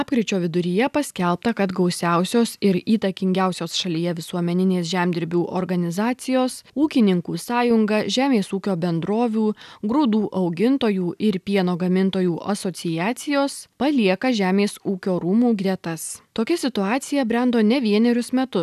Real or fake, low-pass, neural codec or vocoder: real; 14.4 kHz; none